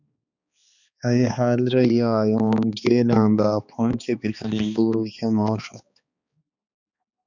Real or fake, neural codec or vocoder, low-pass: fake; codec, 16 kHz, 2 kbps, X-Codec, HuBERT features, trained on balanced general audio; 7.2 kHz